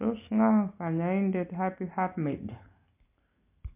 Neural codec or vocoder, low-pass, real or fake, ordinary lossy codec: none; 3.6 kHz; real; MP3, 32 kbps